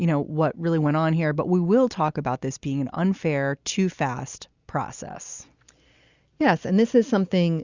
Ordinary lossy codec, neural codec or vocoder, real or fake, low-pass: Opus, 64 kbps; none; real; 7.2 kHz